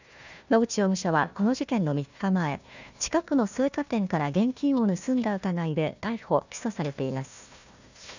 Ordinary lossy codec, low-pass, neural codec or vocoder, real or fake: none; 7.2 kHz; codec, 16 kHz, 1 kbps, FunCodec, trained on Chinese and English, 50 frames a second; fake